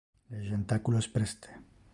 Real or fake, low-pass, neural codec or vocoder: real; 10.8 kHz; none